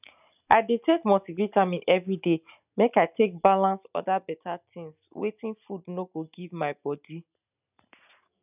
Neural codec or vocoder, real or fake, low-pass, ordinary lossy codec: none; real; 3.6 kHz; none